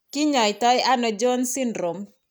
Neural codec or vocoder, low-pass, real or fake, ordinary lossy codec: none; none; real; none